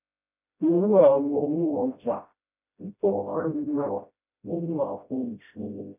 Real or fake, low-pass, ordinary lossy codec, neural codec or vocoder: fake; 3.6 kHz; none; codec, 16 kHz, 0.5 kbps, FreqCodec, smaller model